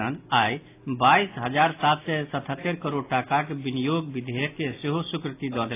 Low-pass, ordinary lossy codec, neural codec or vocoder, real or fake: 3.6 kHz; AAC, 24 kbps; none; real